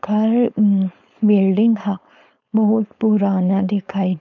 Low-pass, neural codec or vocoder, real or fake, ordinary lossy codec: 7.2 kHz; codec, 16 kHz, 4.8 kbps, FACodec; fake; none